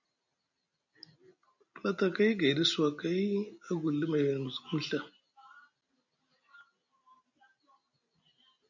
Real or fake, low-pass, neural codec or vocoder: real; 7.2 kHz; none